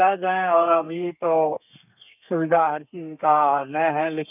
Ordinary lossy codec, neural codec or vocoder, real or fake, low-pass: none; codec, 32 kHz, 1.9 kbps, SNAC; fake; 3.6 kHz